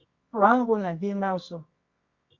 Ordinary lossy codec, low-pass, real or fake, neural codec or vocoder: Opus, 64 kbps; 7.2 kHz; fake; codec, 24 kHz, 0.9 kbps, WavTokenizer, medium music audio release